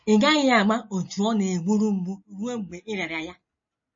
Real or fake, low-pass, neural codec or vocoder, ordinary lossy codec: real; 7.2 kHz; none; MP3, 32 kbps